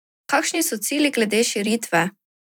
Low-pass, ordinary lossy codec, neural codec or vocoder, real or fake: none; none; vocoder, 44.1 kHz, 128 mel bands every 256 samples, BigVGAN v2; fake